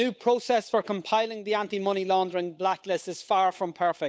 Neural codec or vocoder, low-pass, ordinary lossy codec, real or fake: codec, 16 kHz, 8 kbps, FunCodec, trained on Chinese and English, 25 frames a second; none; none; fake